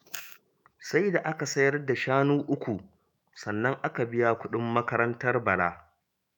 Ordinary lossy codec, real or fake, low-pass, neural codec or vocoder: none; fake; none; autoencoder, 48 kHz, 128 numbers a frame, DAC-VAE, trained on Japanese speech